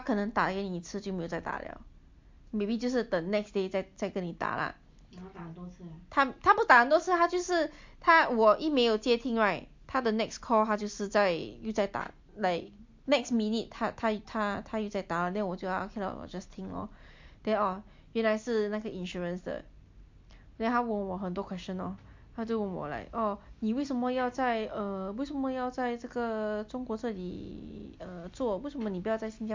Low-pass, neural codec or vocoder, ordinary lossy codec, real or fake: 7.2 kHz; none; MP3, 48 kbps; real